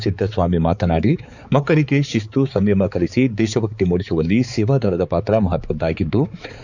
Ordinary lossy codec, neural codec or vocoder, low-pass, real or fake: none; codec, 16 kHz, 4 kbps, X-Codec, HuBERT features, trained on balanced general audio; 7.2 kHz; fake